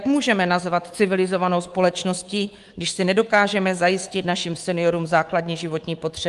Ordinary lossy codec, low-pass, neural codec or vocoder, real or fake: Opus, 24 kbps; 10.8 kHz; codec, 24 kHz, 3.1 kbps, DualCodec; fake